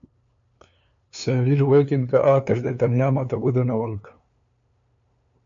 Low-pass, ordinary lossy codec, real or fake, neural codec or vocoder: 7.2 kHz; MP3, 48 kbps; fake; codec, 16 kHz, 2 kbps, FunCodec, trained on LibriTTS, 25 frames a second